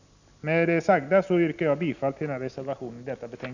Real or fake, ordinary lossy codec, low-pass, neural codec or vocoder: real; none; 7.2 kHz; none